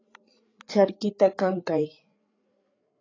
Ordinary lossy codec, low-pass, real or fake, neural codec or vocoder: AAC, 32 kbps; 7.2 kHz; fake; codec, 16 kHz, 8 kbps, FreqCodec, larger model